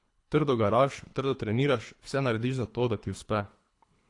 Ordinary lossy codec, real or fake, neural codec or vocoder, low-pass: AAC, 48 kbps; fake; codec, 24 kHz, 3 kbps, HILCodec; 10.8 kHz